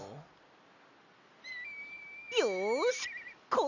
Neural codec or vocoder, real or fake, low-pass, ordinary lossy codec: none; real; 7.2 kHz; none